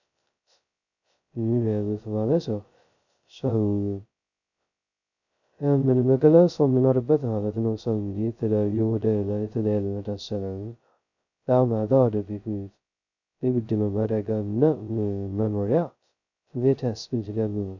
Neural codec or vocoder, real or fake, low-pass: codec, 16 kHz, 0.2 kbps, FocalCodec; fake; 7.2 kHz